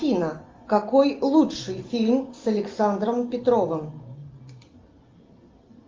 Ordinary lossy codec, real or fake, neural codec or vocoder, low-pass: Opus, 32 kbps; real; none; 7.2 kHz